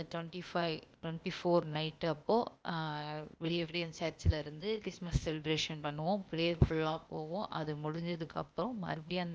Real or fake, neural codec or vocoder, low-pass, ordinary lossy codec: fake; codec, 16 kHz, 0.8 kbps, ZipCodec; none; none